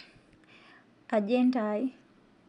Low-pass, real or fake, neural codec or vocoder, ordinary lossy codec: 10.8 kHz; real; none; none